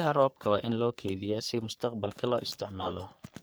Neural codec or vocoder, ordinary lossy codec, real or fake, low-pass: codec, 44.1 kHz, 3.4 kbps, Pupu-Codec; none; fake; none